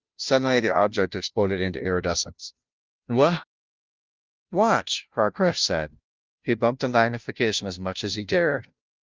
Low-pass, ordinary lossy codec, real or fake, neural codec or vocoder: 7.2 kHz; Opus, 24 kbps; fake; codec, 16 kHz, 0.5 kbps, FunCodec, trained on Chinese and English, 25 frames a second